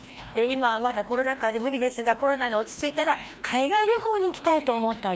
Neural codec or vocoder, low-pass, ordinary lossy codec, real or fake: codec, 16 kHz, 1 kbps, FreqCodec, larger model; none; none; fake